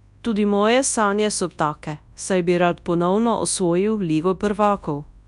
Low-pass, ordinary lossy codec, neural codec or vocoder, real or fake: 10.8 kHz; none; codec, 24 kHz, 0.9 kbps, WavTokenizer, large speech release; fake